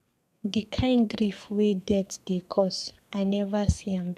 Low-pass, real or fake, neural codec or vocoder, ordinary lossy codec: 14.4 kHz; fake; codec, 32 kHz, 1.9 kbps, SNAC; none